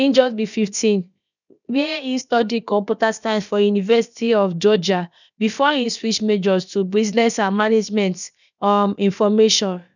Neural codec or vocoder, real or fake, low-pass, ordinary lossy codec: codec, 16 kHz, about 1 kbps, DyCAST, with the encoder's durations; fake; 7.2 kHz; none